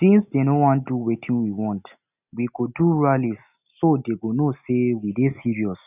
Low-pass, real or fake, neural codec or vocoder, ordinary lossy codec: 3.6 kHz; real; none; none